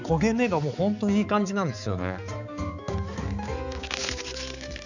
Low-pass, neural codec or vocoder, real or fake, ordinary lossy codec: 7.2 kHz; codec, 16 kHz, 4 kbps, X-Codec, HuBERT features, trained on balanced general audio; fake; none